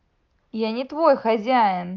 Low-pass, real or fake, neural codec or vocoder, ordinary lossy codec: 7.2 kHz; real; none; Opus, 24 kbps